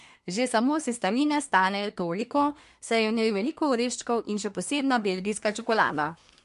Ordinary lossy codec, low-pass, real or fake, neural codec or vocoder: MP3, 64 kbps; 10.8 kHz; fake; codec, 24 kHz, 1 kbps, SNAC